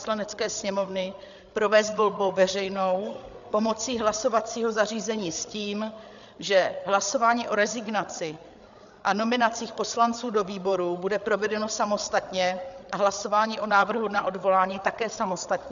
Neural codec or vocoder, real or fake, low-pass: codec, 16 kHz, 8 kbps, FreqCodec, larger model; fake; 7.2 kHz